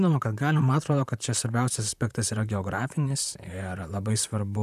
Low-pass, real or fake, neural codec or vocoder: 14.4 kHz; fake; vocoder, 44.1 kHz, 128 mel bands, Pupu-Vocoder